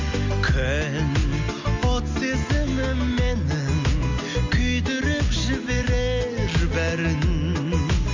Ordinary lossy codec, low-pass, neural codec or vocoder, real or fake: MP3, 48 kbps; 7.2 kHz; none; real